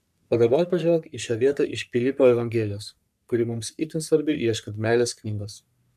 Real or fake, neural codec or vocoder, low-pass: fake; codec, 44.1 kHz, 3.4 kbps, Pupu-Codec; 14.4 kHz